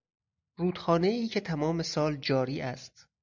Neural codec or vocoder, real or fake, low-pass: none; real; 7.2 kHz